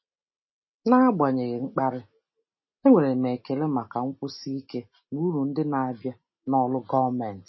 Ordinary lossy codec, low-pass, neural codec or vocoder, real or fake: MP3, 24 kbps; 7.2 kHz; none; real